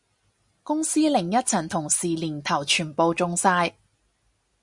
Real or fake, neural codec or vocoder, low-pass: real; none; 10.8 kHz